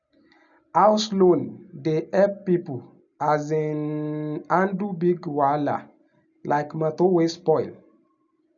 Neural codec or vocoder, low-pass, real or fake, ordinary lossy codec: none; 7.2 kHz; real; none